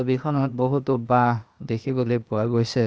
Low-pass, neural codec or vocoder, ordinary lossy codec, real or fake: none; codec, 16 kHz, 0.8 kbps, ZipCodec; none; fake